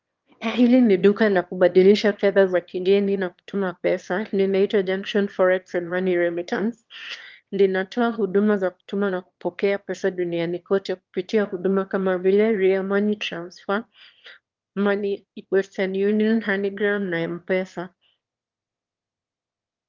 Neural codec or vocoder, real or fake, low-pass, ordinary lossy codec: autoencoder, 22.05 kHz, a latent of 192 numbers a frame, VITS, trained on one speaker; fake; 7.2 kHz; Opus, 32 kbps